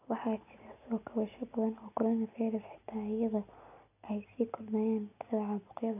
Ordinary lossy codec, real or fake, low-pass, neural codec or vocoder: none; real; 3.6 kHz; none